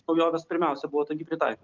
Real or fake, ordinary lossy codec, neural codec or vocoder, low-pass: real; Opus, 24 kbps; none; 7.2 kHz